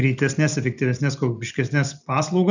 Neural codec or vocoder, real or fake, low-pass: none; real; 7.2 kHz